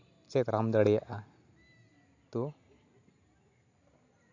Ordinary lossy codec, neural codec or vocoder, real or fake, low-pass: AAC, 48 kbps; none; real; 7.2 kHz